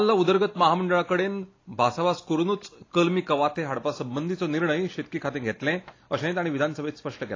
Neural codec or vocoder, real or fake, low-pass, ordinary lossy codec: none; real; 7.2 kHz; AAC, 32 kbps